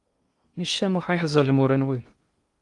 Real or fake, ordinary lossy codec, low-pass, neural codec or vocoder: fake; Opus, 32 kbps; 10.8 kHz; codec, 16 kHz in and 24 kHz out, 0.6 kbps, FocalCodec, streaming, 2048 codes